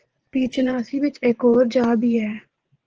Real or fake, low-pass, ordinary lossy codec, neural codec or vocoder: fake; 7.2 kHz; Opus, 16 kbps; vocoder, 24 kHz, 100 mel bands, Vocos